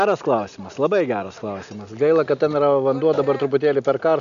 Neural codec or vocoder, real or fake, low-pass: none; real; 7.2 kHz